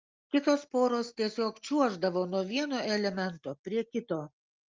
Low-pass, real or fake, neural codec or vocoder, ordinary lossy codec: 7.2 kHz; real; none; Opus, 32 kbps